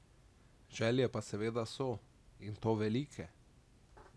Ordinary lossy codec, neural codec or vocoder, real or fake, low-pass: none; none; real; none